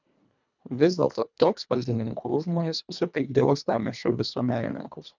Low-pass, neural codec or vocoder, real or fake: 7.2 kHz; codec, 24 kHz, 1.5 kbps, HILCodec; fake